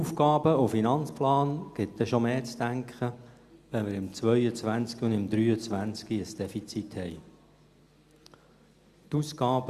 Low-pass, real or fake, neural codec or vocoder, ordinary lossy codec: 14.4 kHz; real; none; Opus, 64 kbps